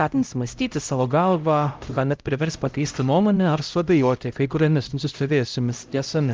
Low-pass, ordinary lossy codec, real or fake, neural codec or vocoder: 7.2 kHz; Opus, 24 kbps; fake; codec, 16 kHz, 0.5 kbps, X-Codec, HuBERT features, trained on LibriSpeech